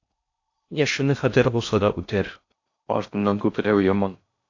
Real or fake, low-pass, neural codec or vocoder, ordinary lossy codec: fake; 7.2 kHz; codec, 16 kHz in and 24 kHz out, 0.6 kbps, FocalCodec, streaming, 4096 codes; AAC, 48 kbps